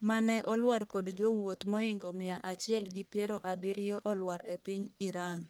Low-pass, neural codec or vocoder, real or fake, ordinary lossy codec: none; codec, 44.1 kHz, 1.7 kbps, Pupu-Codec; fake; none